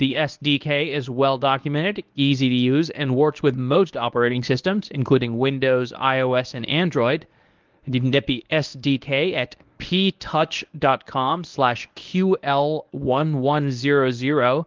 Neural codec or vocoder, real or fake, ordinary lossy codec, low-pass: codec, 24 kHz, 0.9 kbps, WavTokenizer, medium speech release version 1; fake; Opus, 24 kbps; 7.2 kHz